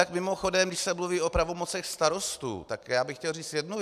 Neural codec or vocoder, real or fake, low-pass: none; real; 14.4 kHz